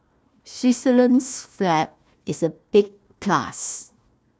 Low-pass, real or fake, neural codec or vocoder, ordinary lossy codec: none; fake; codec, 16 kHz, 1 kbps, FunCodec, trained on Chinese and English, 50 frames a second; none